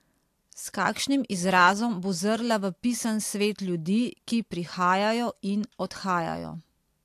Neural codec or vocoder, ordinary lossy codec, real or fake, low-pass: none; AAC, 64 kbps; real; 14.4 kHz